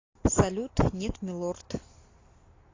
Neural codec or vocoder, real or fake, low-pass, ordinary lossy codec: none; real; 7.2 kHz; AAC, 32 kbps